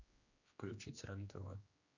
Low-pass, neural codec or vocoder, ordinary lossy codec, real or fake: 7.2 kHz; codec, 16 kHz, 2 kbps, X-Codec, HuBERT features, trained on general audio; Opus, 64 kbps; fake